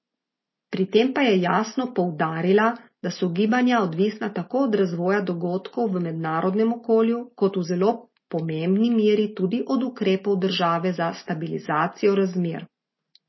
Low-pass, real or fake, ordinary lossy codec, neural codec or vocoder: 7.2 kHz; real; MP3, 24 kbps; none